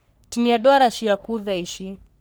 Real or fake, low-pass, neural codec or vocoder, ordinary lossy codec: fake; none; codec, 44.1 kHz, 3.4 kbps, Pupu-Codec; none